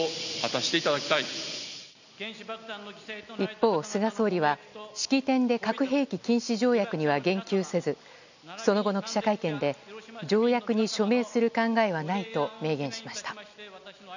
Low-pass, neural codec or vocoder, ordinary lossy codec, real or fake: 7.2 kHz; none; none; real